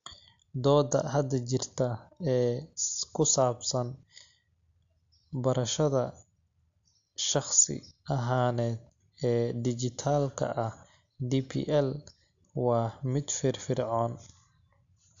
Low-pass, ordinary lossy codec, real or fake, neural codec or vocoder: 7.2 kHz; AAC, 64 kbps; real; none